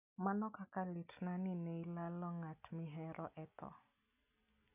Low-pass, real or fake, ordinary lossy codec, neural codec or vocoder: 3.6 kHz; real; none; none